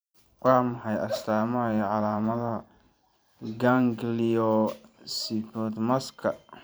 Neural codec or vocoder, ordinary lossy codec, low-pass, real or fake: vocoder, 44.1 kHz, 128 mel bands every 512 samples, BigVGAN v2; none; none; fake